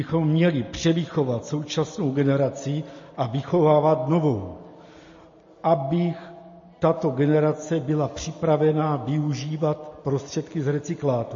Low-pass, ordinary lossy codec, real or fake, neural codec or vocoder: 7.2 kHz; MP3, 32 kbps; real; none